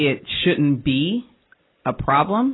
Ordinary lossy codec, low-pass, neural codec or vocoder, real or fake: AAC, 16 kbps; 7.2 kHz; none; real